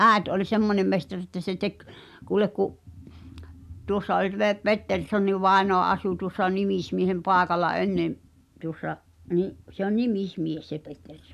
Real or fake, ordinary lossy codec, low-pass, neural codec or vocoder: real; AAC, 96 kbps; 14.4 kHz; none